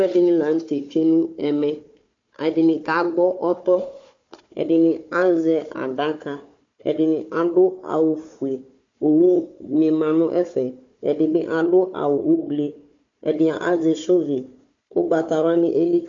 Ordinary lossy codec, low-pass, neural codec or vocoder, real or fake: MP3, 48 kbps; 7.2 kHz; codec, 16 kHz, 4 kbps, FunCodec, trained on Chinese and English, 50 frames a second; fake